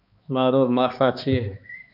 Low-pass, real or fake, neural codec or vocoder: 5.4 kHz; fake; codec, 16 kHz, 2 kbps, X-Codec, HuBERT features, trained on balanced general audio